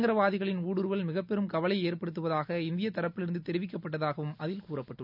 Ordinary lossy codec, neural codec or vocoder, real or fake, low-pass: none; none; real; 5.4 kHz